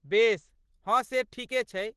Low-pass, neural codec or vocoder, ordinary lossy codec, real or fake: 10.8 kHz; none; Opus, 16 kbps; real